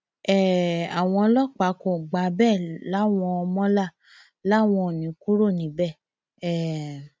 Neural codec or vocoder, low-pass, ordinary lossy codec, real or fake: none; none; none; real